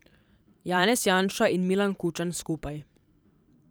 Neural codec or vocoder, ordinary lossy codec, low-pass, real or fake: vocoder, 44.1 kHz, 128 mel bands every 512 samples, BigVGAN v2; none; none; fake